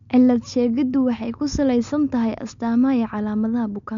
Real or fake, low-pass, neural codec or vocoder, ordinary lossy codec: real; 7.2 kHz; none; MP3, 64 kbps